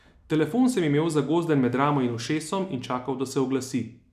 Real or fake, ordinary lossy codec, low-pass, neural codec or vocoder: real; none; 14.4 kHz; none